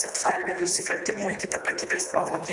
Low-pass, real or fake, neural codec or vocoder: 10.8 kHz; fake; codec, 24 kHz, 1.5 kbps, HILCodec